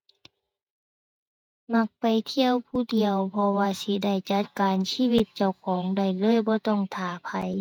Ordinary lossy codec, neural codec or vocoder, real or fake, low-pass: none; vocoder, 48 kHz, 128 mel bands, Vocos; fake; 19.8 kHz